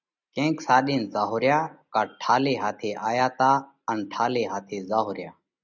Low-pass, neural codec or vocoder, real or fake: 7.2 kHz; none; real